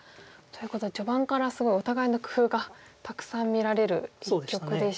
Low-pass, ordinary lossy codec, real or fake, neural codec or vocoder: none; none; real; none